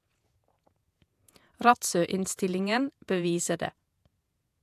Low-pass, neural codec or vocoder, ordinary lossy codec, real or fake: 14.4 kHz; vocoder, 48 kHz, 128 mel bands, Vocos; AAC, 96 kbps; fake